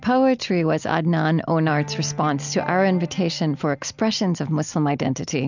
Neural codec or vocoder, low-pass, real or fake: none; 7.2 kHz; real